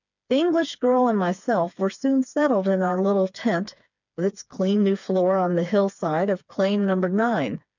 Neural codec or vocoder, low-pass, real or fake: codec, 16 kHz, 4 kbps, FreqCodec, smaller model; 7.2 kHz; fake